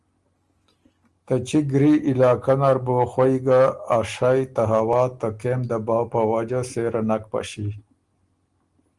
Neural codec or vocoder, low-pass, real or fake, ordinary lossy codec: none; 10.8 kHz; real; Opus, 24 kbps